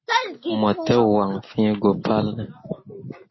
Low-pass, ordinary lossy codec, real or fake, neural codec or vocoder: 7.2 kHz; MP3, 24 kbps; real; none